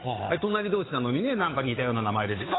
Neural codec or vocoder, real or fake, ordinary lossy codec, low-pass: codec, 16 kHz, 8 kbps, FunCodec, trained on Chinese and English, 25 frames a second; fake; AAC, 16 kbps; 7.2 kHz